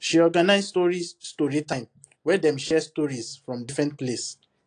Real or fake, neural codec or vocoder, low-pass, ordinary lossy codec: real; none; 9.9 kHz; AAC, 48 kbps